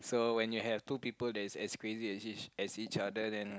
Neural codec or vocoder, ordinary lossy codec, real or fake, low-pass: none; none; real; none